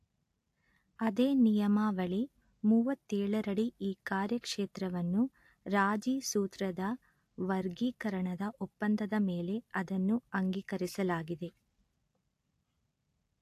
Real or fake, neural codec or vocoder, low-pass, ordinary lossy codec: real; none; 14.4 kHz; AAC, 64 kbps